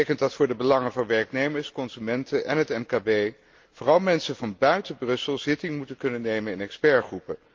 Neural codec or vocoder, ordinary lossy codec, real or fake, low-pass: none; Opus, 32 kbps; real; 7.2 kHz